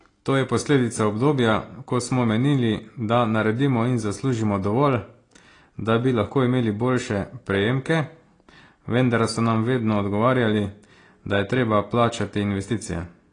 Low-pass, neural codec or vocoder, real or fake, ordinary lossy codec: 9.9 kHz; none; real; AAC, 32 kbps